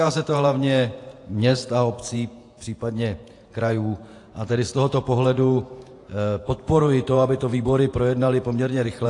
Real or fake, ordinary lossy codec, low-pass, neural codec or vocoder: fake; AAC, 48 kbps; 10.8 kHz; vocoder, 48 kHz, 128 mel bands, Vocos